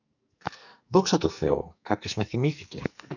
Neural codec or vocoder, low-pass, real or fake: codec, 44.1 kHz, 2.6 kbps, SNAC; 7.2 kHz; fake